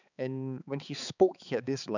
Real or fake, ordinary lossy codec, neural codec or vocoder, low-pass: fake; none; codec, 16 kHz, 4 kbps, X-Codec, HuBERT features, trained on balanced general audio; 7.2 kHz